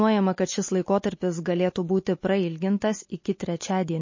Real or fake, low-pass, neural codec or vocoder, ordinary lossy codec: real; 7.2 kHz; none; MP3, 32 kbps